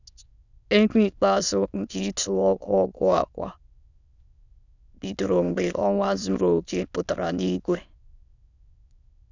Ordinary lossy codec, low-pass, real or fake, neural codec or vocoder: none; 7.2 kHz; fake; autoencoder, 22.05 kHz, a latent of 192 numbers a frame, VITS, trained on many speakers